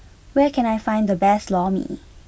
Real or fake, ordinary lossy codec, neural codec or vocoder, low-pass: real; none; none; none